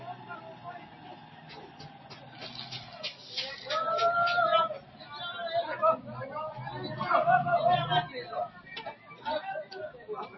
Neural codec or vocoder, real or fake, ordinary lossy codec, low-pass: none; real; MP3, 24 kbps; 7.2 kHz